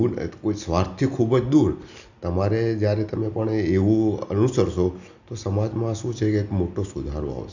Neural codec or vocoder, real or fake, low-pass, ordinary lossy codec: none; real; 7.2 kHz; none